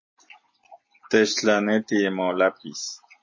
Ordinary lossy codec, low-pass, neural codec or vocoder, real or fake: MP3, 32 kbps; 7.2 kHz; none; real